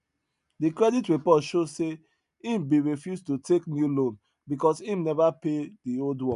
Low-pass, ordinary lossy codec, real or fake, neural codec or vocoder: 10.8 kHz; none; real; none